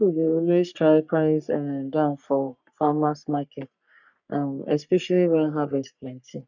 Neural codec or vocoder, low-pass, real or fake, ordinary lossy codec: codec, 44.1 kHz, 3.4 kbps, Pupu-Codec; 7.2 kHz; fake; none